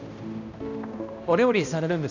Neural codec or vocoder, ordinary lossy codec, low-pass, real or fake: codec, 16 kHz, 1 kbps, X-Codec, HuBERT features, trained on balanced general audio; none; 7.2 kHz; fake